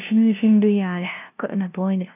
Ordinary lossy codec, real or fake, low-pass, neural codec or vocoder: none; fake; 3.6 kHz; codec, 16 kHz, 0.5 kbps, FunCodec, trained on LibriTTS, 25 frames a second